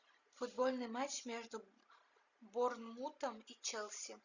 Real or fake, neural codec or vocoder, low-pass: real; none; 7.2 kHz